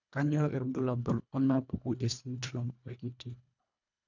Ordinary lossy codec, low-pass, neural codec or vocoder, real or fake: none; 7.2 kHz; codec, 24 kHz, 1.5 kbps, HILCodec; fake